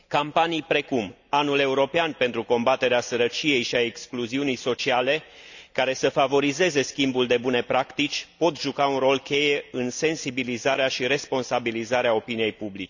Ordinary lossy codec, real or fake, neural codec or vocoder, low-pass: none; real; none; 7.2 kHz